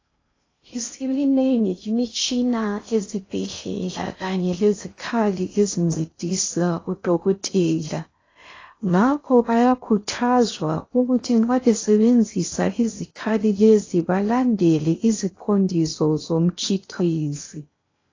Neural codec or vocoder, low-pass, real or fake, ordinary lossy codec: codec, 16 kHz in and 24 kHz out, 0.6 kbps, FocalCodec, streaming, 2048 codes; 7.2 kHz; fake; AAC, 32 kbps